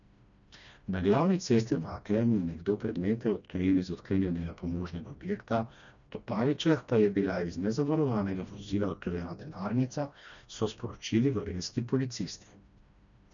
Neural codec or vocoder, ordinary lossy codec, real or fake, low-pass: codec, 16 kHz, 1 kbps, FreqCodec, smaller model; none; fake; 7.2 kHz